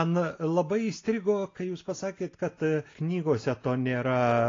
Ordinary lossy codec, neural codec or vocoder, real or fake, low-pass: AAC, 32 kbps; none; real; 7.2 kHz